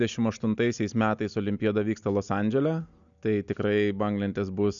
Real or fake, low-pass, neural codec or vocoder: real; 7.2 kHz; none